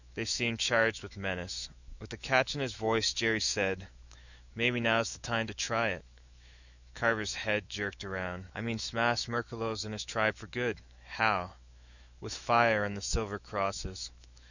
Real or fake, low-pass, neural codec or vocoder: fake; 7.2 kHz; autoencoder, 48 kHz, 128 numbers a frame, DAC-VAE, trained on Japanese speech